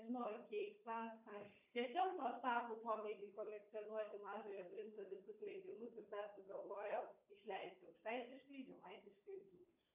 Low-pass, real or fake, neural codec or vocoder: 3.6 kHz; fake; codec, 16 kHz, 4 kbps, FunCodec, trained on Chinese and English, 50 frames a second